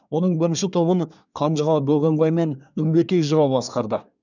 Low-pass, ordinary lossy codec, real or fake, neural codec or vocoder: 7.2 kHz; none; fake; codec, 24 kHz, 1 kbps, SNAC